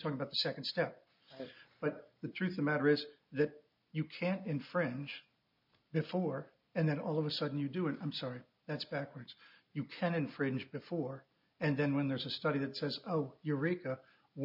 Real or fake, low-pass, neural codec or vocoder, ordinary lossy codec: real; 5.4 kHz; none; MP3, 48 kbps